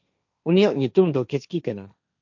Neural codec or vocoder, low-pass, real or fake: codec, 16 kHz, 1.1 kbps, Voila-Tokenizer; 7.2 kHz; fake